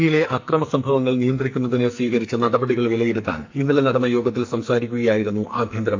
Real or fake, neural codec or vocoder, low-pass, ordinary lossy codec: fake; codec, 44.1 kHz, 2.6 kbps, SNAC; 7.2 kHz; AAC, 48 kbps